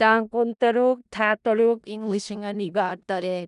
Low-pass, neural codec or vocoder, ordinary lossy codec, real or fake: 10.8 kHz; codec, 16 kHz in and 24 kHz out, 0.4 kbps, LongCat-Audio-Codec, four codebook decoder; none; fake